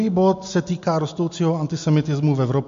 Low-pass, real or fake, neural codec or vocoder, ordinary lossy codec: 7.2 kHz; real; none; MP3, 48 kbps